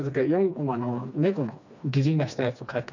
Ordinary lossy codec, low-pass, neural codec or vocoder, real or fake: none; 7.2 kHz; codec, 16 kHz, 2 kbps, FreqCodec, smaller model; fake